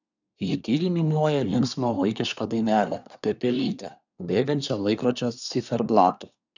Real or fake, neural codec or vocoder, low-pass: fake; codec, 24 kHz, 1 kbps, SNAC; 7.2 kHz